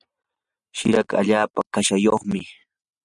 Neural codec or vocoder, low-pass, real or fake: none; 10.8 kHz; real